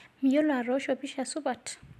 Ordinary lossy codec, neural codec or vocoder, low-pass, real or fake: AAC, 96 kbps; none; 14.4 kHz; real